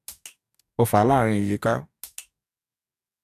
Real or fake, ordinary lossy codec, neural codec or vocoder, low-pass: fake; AAC, 96 kbps; codec, 44.1 kHz, 2.6 kbps, DAC; 14.4 kHz